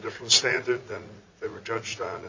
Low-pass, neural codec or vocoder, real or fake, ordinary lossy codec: 7.2 kHz; vocoder, 44.1 kHz, 80 mel bands, Vocos; fake; MP3, 64 kbps